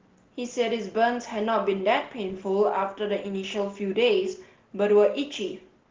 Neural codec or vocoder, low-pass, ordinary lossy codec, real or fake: none; 7.2 kHz; Opus, 16 kbps; real